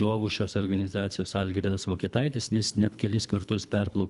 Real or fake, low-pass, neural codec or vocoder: fake; 10.8 kHz; codec, 24 kHz, 3 kbps, HILCodec